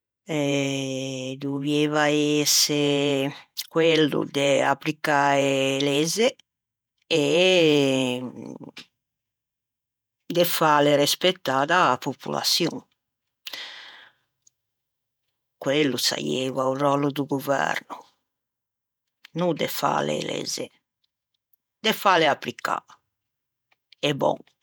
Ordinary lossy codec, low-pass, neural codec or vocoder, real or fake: none; none; vocoder, 48 kHz, 128 mel bands, Vocos; fake